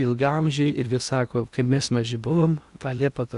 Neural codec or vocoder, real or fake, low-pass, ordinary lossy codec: codec, 16 kHz in and 24 kHz out, 0.8 kbps, FocalCodec, streaming, 65536 codes; fake; 10.8 kHz; Opus, 64 kbps